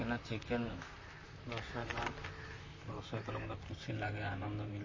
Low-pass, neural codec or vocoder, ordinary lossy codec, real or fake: 7.2 kHz; vocoder, 44.1 kHz, 128 mel bands, Pupu-Vocoder; MP3, 32 kbps; fake